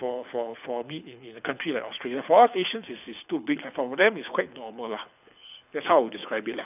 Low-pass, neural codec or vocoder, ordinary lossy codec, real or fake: 3.6 kHz; codec, 24 kHz, 6 kbps, HILCodec; none; fake